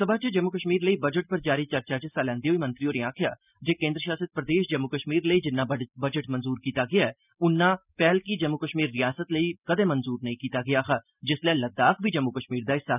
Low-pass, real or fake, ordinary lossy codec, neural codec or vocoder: 3.6 kHz; real; none; none